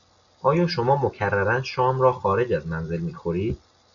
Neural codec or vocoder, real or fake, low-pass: none; real; 7.2 kHz